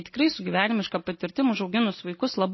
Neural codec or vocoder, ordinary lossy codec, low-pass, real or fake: none; MP3, 24 kbps; 7.2 kHz; real